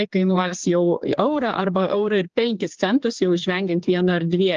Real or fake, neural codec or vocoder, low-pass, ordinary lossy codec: fake; codec, 16 kHz, 4 kbps, X-Codec, HuBERT features, trained on general audio; 7.2 kHz; Opus, 32 kbps